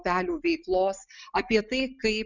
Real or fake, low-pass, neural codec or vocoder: real; 7.2 kHz; none